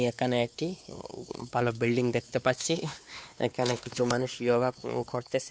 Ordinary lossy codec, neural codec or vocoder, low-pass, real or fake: none; codec, 16 kHz, 2 kbps, X-Codec, WavLM features, trained on Multilingual LibriSpeech; none; fake